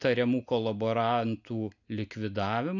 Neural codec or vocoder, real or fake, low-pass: none; real; 7.2 kHz